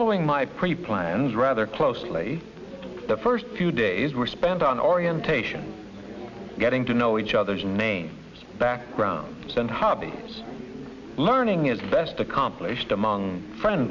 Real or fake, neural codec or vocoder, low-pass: real; none; 7.2 kHz